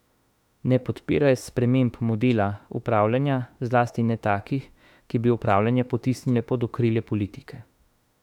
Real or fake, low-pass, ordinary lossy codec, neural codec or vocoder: fake; 19.8 kHz; none; autoencoder, 48 kHz, 32 numbers a frame, DAC-VAE, trained on Japanese speech